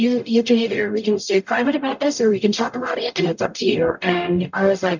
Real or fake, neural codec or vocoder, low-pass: fake; codec, 44.1 kHz, 0.9 kbps, DAC; 7.2 kHz